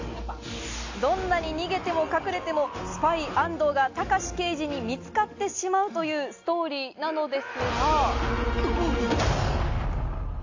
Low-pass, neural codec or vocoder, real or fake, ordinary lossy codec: 7.2 kHz; none; real; AAC, 48 kbps